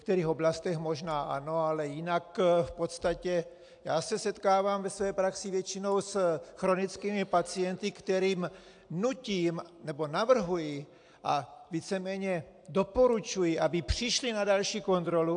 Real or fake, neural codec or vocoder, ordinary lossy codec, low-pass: real; none; AAC, 64 kbps; 9.9 kHz